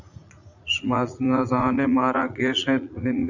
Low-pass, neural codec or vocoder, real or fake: 7.2 kHz; vocoder, 44.1 kHz, 80 mel bands, Vocos; fake